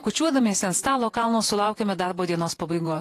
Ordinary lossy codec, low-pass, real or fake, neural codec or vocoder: AAC, 48 kbps; 14.4 kHz; fake; vocoder, 48 kHz, 128 mel bands, Vocos